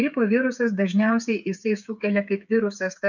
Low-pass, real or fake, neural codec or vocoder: 7.2 kHz; fake; codec, 16 kHz, 8 kbps, FreqCodec, smaller model